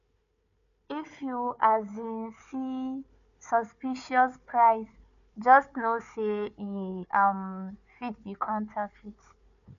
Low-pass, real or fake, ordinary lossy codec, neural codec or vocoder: 7.2 kHz; fake; none; codec, 16 kHz, 4 kbps, FunCodec, trained on Chinese and English, 50 frames a second